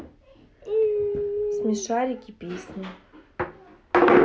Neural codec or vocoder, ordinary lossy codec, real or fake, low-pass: none; none; real; none